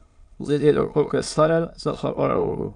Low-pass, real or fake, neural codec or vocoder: 9.9 kHz; fake; autoencoder, 22.05 kHz, a latent of 192 numbers a frame, VITS, trained on many speakers